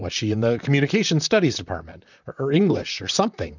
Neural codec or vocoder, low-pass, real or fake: vocoder, 44.1 kHz, 128 mel bands, Pupu-Vocoder; 7.2 kHz; fake